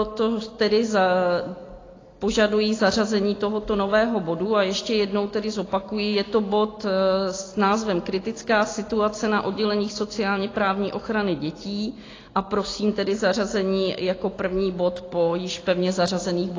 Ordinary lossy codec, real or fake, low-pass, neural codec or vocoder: AAC, 32 kbps; real; 7.2 kHz; none